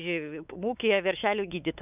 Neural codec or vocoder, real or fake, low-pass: codec, 16 kHz, 4 kbps, X-Codec, WavLM features, trained on Multilingual LibriSpeech; fake; 3.6 kHz